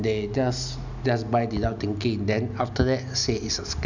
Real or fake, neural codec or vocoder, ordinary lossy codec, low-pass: real; none; none; 7.2 kHz